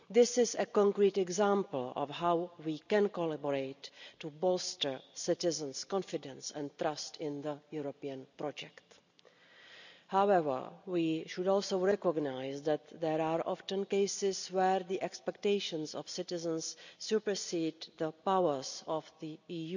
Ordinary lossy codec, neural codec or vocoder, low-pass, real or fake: none; none; 7.2 kHz; real